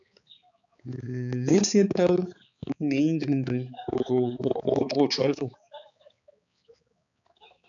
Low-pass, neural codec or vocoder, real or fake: 7.2 kHz; codec, 16 kHz, 4 kbps, X-Codec, HuBERT features, trained on balanced general audio; fake